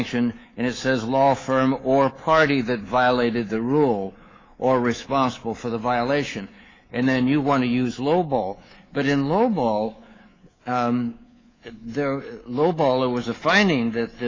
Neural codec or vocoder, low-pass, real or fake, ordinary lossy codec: codec, 24 kHz, 3.1 kbps, DualCodec; 7.2 kHz; fake; AAC, 32 kbps